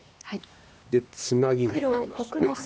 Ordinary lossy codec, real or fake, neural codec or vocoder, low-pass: none; fake; codec, 16 kHz, 2 kbps, X-Codec, HuBERT features, trained on LibriSpeech; none